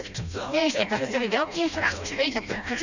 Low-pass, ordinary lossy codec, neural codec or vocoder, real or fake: 7.2 kHz; none; codec, 16 kHz, 1 kbps, FreqCodec, smaller model; fake